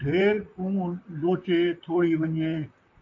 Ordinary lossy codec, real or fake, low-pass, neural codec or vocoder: MP3, 64 kbps; fake; 7.2 kHz; vocoder, 44.1 kHz, 128 mel bands, Pupu-Vocoder